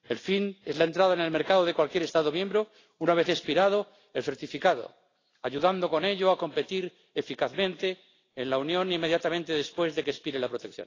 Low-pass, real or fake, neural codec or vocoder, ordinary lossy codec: 7.2 kHz; real; none; AAC, 32 kbps